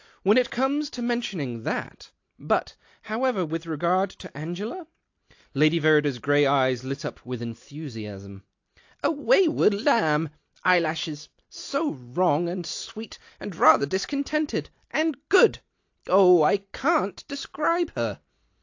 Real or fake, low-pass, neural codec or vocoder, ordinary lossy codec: real; 7.2 kHz; none; AAC, 48 kbps